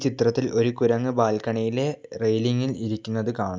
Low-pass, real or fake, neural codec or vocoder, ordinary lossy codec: none; real; none; none